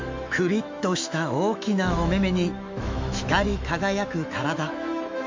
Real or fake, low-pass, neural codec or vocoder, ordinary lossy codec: fake; 7.2 kHz; autoencoder, 48 kHz, 128 numbers a frame, DAC-VAE, trained on Japanese speech; MP3, 48 kbps